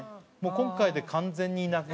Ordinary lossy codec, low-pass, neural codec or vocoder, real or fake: none; none; none; real